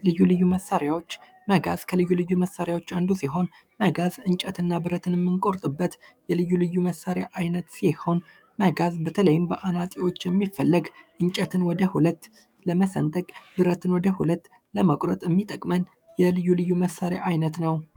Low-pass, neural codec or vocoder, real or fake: 19.8 kHz; codec, 44.1 kHz, 7.8 kbps, DAC; fake